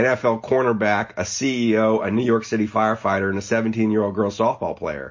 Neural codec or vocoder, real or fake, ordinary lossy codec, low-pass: none; real; MP3, 32 kbps; 7.2 kHz